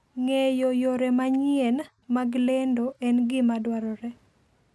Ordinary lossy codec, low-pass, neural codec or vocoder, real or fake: none; none; none; real